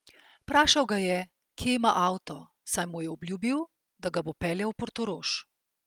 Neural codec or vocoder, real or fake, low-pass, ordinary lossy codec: none; real; 19.8 kHz; Opus, 32 kbps